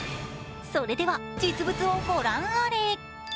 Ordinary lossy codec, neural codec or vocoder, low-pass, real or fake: none; none; none; real